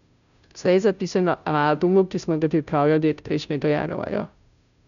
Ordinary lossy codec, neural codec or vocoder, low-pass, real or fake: none; codec, 16 kHz, 0.5 kbps, FunCodec, trained on Chinese and English, 25 frames a second; 7.2 kHz; fake